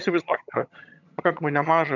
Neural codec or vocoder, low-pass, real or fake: vocoder, 22.05 kHz, 80 mel bands, HiFi-GAN; 7.2 kHz; fake